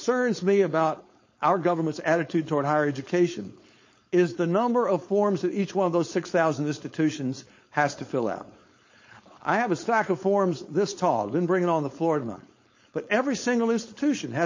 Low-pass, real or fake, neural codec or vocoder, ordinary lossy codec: 7.2 kHz; fake; codec, 16 kHz, 4.8 kbps, FACodec; MP3, 32 kbps